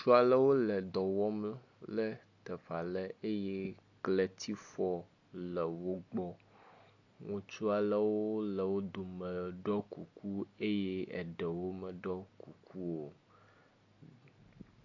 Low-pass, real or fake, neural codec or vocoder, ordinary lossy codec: 7.2 kHz; real; none; AAC, 48 kbps